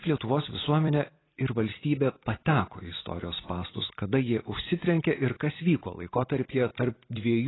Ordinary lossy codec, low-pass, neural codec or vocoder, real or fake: AAC, 16 kbps; 7.2 kHz; vocoder, 44.1 kHz, 128 mel bands every 256 samples, BigVGAN v2; fake